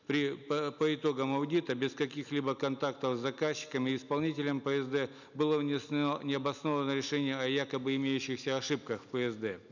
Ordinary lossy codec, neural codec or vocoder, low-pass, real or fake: none; none; 7.2 kHz; real